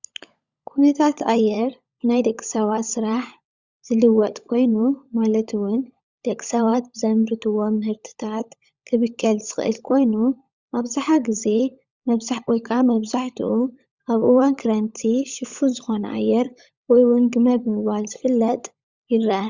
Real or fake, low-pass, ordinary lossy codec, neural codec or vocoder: fake; 7.2 kHz; Opus, 64 kbps; codec, 16 kHz, 16 kbps, FunCodec, trained on LibriTTS, 50 frames a second